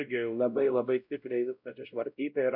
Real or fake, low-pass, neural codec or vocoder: fake; 5.4 kHz; codec, 16 kHz, 0.5 kbps, X-Codec, WavLM features, trained on Multilingual LibriSpeech